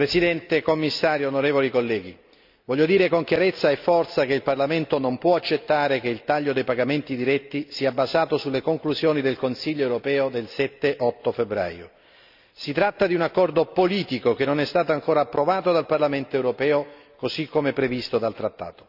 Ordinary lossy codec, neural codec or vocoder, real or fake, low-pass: none; none; real; 5.4 kHz